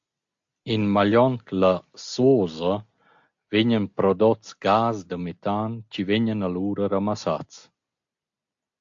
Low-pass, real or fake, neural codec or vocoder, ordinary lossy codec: 7.2 kHz; real; none; AAC, 64 kbps